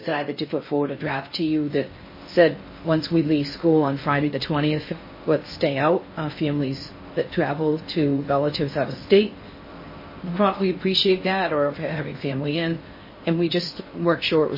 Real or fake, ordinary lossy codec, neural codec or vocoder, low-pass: fake; MP3, 24 kbps; codec, 16 kHz in and 24 kHz out, 0.6 kbps, FocalCodec, streaming, 4096 codes; 5.4 kHz